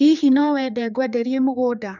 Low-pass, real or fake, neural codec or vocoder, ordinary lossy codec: 7.2 kHz; fake; codec, 16 kHz, 4 kbps, X-Codec, HuBERT features, trained on general audio; none